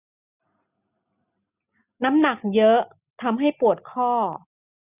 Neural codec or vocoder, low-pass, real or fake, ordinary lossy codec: none; 3.6 kHz; real; none